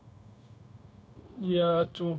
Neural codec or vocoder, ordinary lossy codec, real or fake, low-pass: codec, 16 kHz, 0.9 kbps, LongCat-Audio-Codec; none; fake; none